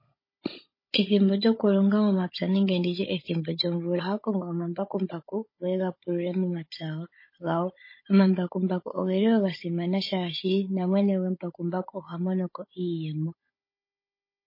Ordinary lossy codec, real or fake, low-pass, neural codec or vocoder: MP3, 24 kbps; fake; 5.4 kHz; codec, 16 kHz, 16 kbps, FunCodec, trained on Chinese and English, 50 frames a second